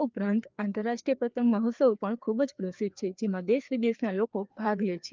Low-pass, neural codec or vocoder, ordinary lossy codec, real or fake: 7.2 kHz; codec, 16 kHz, 2 kbps, FreqCodec, larger model; Opus, 24 kbps; fake